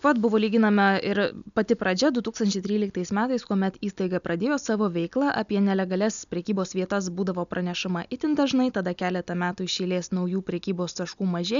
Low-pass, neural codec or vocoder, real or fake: 7.2 kHz; none; real